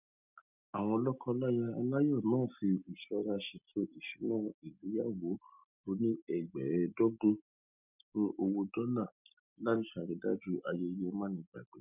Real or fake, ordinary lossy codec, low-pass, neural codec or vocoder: real; none; 3.6 kHz; none